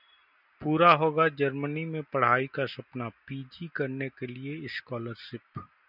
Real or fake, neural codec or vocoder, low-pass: real; none; 5.4 kHz